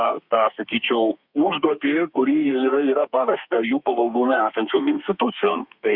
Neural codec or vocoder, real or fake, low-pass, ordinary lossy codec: codec, 32 kHz, 1.9 kbps, SNAC; fake; 5.4 kHz; Opus, 64 kbps